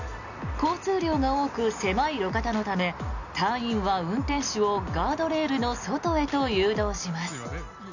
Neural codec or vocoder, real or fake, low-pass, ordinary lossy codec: none; real; 7.2 kHz; none